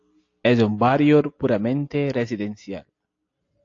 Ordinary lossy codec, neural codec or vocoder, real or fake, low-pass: AAC, 48 kbps; none; real; 7.2 kHz